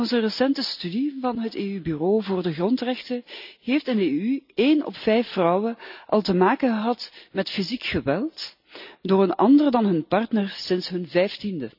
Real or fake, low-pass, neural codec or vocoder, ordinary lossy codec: real; 5.4 kHz; none; none